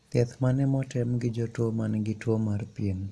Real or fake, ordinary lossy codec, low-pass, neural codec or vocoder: real; none; none; none